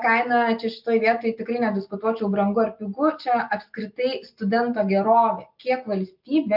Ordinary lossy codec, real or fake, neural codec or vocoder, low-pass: MP3, 48 kbps; real; none; 5.4 kHz